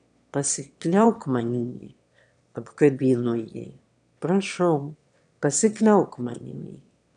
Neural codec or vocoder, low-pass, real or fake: autoencoder, 22.05 kHz, a latent of 192 numbers a frame, VITS, trained on one speaker; 9.9 kHz; fake